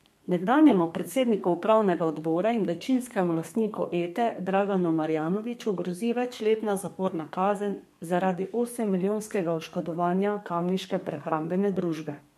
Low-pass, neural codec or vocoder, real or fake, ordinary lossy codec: 14.4 kHz; codec, 32 kHz, 1.9 kbps, SNAC; fake; MP3, 64 kbps